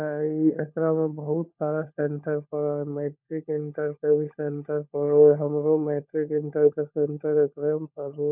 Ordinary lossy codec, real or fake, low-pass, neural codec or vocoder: none; fake; 3.6 kHz; codec, 16 kHz, 16 kbps, FunCodec, trained on LibriTTS, 50 frames a second